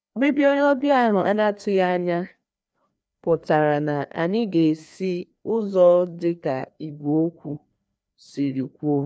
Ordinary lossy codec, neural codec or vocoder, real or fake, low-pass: none; codec, 16 kHz, 2 kbps, FreqCodec, larger model; fake; none